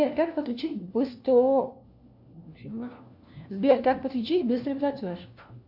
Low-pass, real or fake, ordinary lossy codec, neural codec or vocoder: 5.4 kHz; fake; AAC, 48 kbps; codec, 16 kHz, 1 kbps, FunCodec, trained on LibriTTS, 50 frames a second